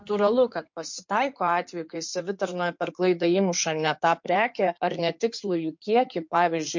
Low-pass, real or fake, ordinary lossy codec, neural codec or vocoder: 7.2 kHz; fake; MP3, 48 kbps; codec, 16 kHz in and 24 kHz out, 2.2 kbps, FireRedTTS-2 codec